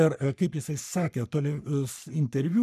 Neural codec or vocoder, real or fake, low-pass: codec, 44.1 kHz, 3.4 kbps, Pupu-Codec; fake; 14.4 kHz